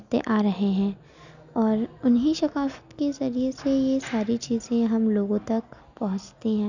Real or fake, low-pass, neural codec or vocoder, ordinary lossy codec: real; 7.2 kHz; none; none